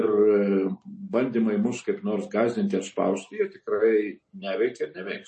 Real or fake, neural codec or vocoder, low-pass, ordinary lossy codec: real; none; 10.8 kHz; MP3, 32 kbps